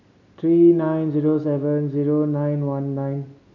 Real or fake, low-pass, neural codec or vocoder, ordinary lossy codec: real; 7.2 kHz; none; none